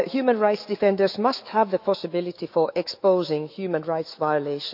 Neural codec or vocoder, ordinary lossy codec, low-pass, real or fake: autoencoder, 48 kHz, 128 numbers a frame, DAC-VAE, trained on Japanese speech; none; 5.4 kHz; fake